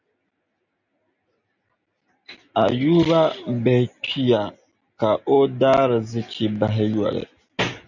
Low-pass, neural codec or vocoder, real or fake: 7.2 kHz; none; real